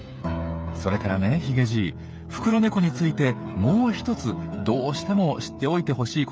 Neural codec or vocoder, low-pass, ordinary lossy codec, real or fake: codec, 16 kHz, 8 kbps, FreqCodec, smaller model; none; none; fake